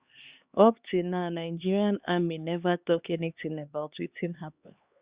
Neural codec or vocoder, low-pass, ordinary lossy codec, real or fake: codec, 16 kHz, 2 kbps, X-Codec, HuBERT features, trained on LibriSpeech; 3.6 kHz; Opus, 64 kbps; fake